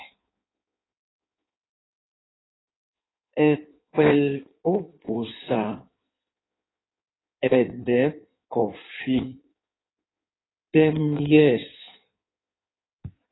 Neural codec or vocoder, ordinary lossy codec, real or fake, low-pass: codec, 16 kHz in and 24 kHz out, 1.1 kbps, FireRedTTS-2 codec; AAC, 16 kbps; fake; 7.2 kHz